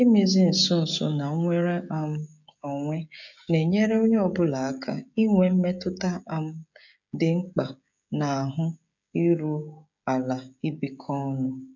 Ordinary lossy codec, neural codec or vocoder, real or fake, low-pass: none; codec, 16 kHz, 16 kbps, FreqCodec, smaller model; fake; 7.2 kHz